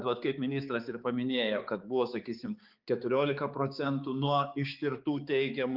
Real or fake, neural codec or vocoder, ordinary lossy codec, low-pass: fake; codec, 16 kHz, 4 kbps, X-Codec, HuBERT features, trained on balanced general audio; Opus, 24 kbps; 5.4 kHz